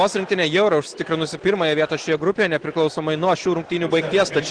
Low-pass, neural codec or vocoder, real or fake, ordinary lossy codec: 9.9 kHz; none; real; Opus, 16 kbps